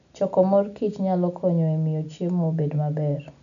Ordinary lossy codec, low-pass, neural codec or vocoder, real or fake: none; 7.2 kHz; none; real